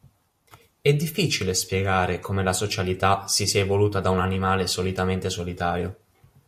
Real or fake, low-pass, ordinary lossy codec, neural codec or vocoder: real; 14.4 kHz; MP3, 64 kbps; none